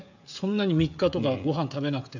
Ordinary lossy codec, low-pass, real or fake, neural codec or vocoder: none; 7.2 kHz; fake; codec, 16 kHz, 16 kbps, FreqCodec, smaller model